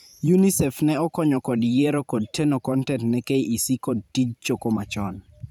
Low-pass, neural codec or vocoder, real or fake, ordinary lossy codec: 19.8 kHz; vocoder, 44.1 kHz, 128 mel bands every 512 samples, BigVGAN v2; fake; none